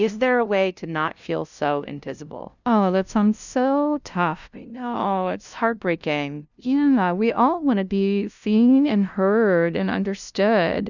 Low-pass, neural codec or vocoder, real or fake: 7.2 kHz; codec, 16 kHz, 0.5 kbps, FunCodec, trained on LibriTTS, 25 frames a second; fake